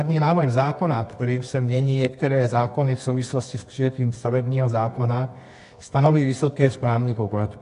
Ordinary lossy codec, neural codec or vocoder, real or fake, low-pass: AAC, 64 kbps; codec, 24 kHz, 0.9 kbps, WavTokenizer, medium music audio release; fake; 10.8 kHz